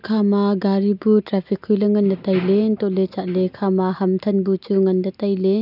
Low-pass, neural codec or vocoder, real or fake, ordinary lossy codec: 5.4 kHz; none; real; none